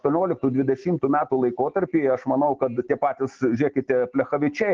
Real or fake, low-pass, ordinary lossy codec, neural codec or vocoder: real; 7.2 kHz; Opus, 16 kbps; none